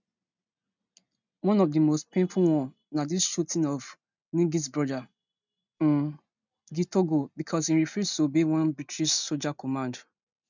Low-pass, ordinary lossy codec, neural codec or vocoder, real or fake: 7.2 kHz; none; none; real